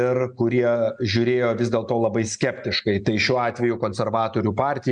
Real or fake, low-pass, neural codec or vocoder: real; 9.9 kHz; none